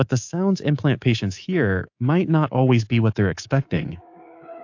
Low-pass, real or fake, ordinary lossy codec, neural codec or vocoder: 7.2 kHz; fake; AAC, 48 kbps; codec, 24 kHz, 3.1 kbps, DualCodec